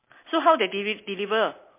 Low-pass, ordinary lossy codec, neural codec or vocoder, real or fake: 3.6 kHz; MP3, 24 kbps; none; real